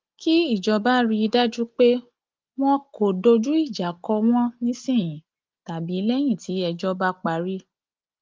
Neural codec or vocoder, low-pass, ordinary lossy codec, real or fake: none; 7.2 kHz; Opus, 32 kbps; real